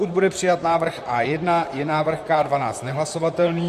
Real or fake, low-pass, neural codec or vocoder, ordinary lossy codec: fake; 14.4 kHz; vocoder, 44.1 kHz, 128 mel bands, Pupu-Vocoder; MP3, 64 kbps